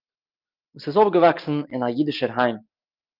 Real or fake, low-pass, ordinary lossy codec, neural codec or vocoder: real; 5.4 kHz; Opus, 24 kbps; none